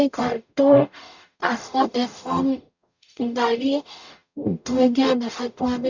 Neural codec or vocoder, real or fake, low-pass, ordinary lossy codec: codec, 44.1 kHz, 0.9 kbps, DAC; fake; 7.2 kHz; none